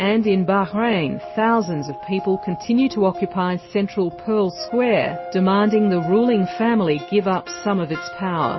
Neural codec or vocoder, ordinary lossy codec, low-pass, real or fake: none; MP3, 24 kbps; 7.2 kHz; real